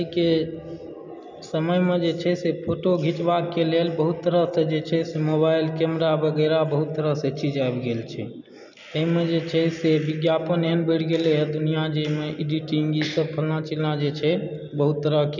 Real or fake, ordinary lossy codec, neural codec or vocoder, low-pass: real; none; none; 7.2 kHz